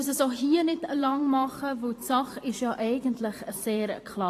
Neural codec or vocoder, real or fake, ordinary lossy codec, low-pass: vocoder, 44.1 kHz, 128 mel bands every 512 samples, BigVGAN v2; fake; AAC, 48 kbps; 14.4 kHz